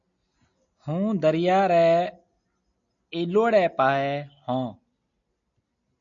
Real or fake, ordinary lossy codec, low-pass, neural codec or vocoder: real; MP3, 96 kbps; 7.2 kHz; none